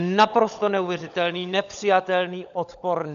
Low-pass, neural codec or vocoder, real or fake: 7.2 kHz; codec, 16 kHz, 16 kbps, FunCodec, trained on LibriTTS, 50 frames a second; fake